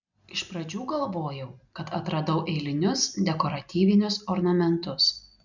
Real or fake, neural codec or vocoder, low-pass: real; none; 7.2 kHz